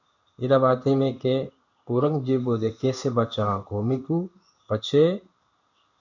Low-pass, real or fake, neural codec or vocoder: 7.2 kHz; fake; codec, 16 kHz in and 24 kHz out, 1 kbps, XY-Tokenizer